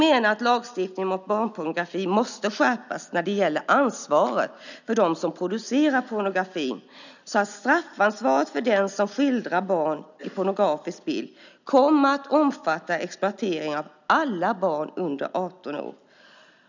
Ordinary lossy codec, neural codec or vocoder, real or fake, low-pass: none; none; real; 7.2 kHz